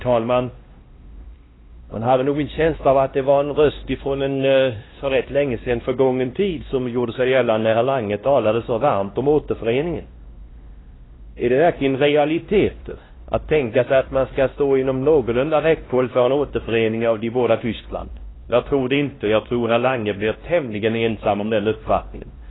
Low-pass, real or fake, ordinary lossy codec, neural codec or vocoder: 7.2 kHz; fake; AAC, 16 kbps; codec, 16 kHz, 1 kbps, X-Codec, WavLM features, trained on Multilingual LibriSpeech